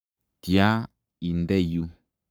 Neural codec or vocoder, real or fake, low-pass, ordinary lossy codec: codec, 44.1 kHz, 7.8 kbps, Pupu-Codec; fake; none; none